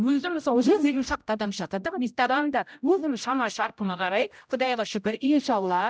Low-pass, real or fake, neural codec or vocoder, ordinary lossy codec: none; fake; codec, 16 kHz, 0.5 kbps, X-Codec, HuBERT features, trained on general audio; none